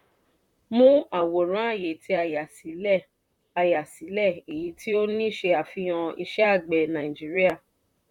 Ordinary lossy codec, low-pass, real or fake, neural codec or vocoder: none; 19.8 kHz; fake; vocoder, 44.1 kHz, 128 mel bands, Pupu-Vocoder